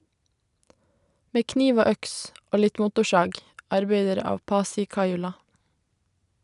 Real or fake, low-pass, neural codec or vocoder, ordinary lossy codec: real; 10.8 kHz; none; none